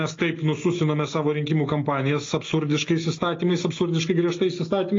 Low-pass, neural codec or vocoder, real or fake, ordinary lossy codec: 7.2 kHz; none; real; AAC, 32 kbps